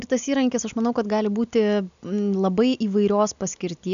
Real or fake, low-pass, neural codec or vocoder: real; 7.2 kHz; none